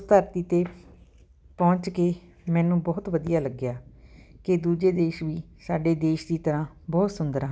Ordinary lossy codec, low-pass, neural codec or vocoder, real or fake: none; none; none; real